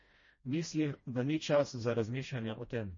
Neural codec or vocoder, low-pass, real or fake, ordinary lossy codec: codec, 16 kHz, 1 kbps, FreqCodec, smaller model; 7.2 kHz; fake; MP3, 32 kbps